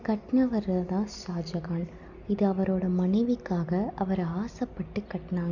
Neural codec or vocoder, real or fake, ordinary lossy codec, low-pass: none; real; none; 7.2 kHz